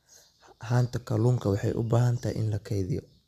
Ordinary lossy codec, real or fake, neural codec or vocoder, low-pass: none; real; none; 14.4 kHz